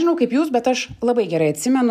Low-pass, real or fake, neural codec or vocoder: 14.4 kHz; real; none